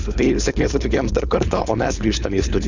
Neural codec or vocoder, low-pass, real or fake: codec, 16 kHz, 4.8 kbps, FACodec; 7.2 kHz; fake